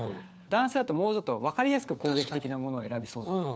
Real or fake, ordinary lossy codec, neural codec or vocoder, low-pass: fake; none; codec, 16 kHz, 4 kbps, FunCodec, trained on LibriTTS, 50 frames a second; none